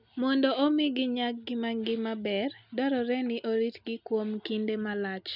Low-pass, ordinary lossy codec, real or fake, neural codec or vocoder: 5.4 kHz; none; real; none